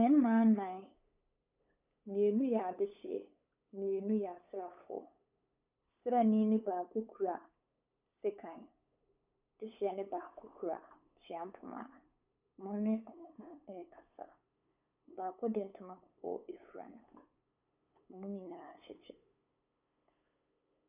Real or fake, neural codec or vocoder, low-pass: fake; codec, 16 kHz, 8 kbps, FunCodec, trained on LibriTTS, 25 frames a second; 3.6 kHz